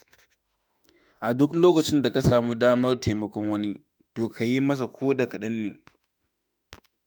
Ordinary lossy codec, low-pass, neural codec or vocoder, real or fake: none; none; autoencoder, 48 kHz, 32 numbers a frame, DAC-VAE, trained on Japanese speech; fake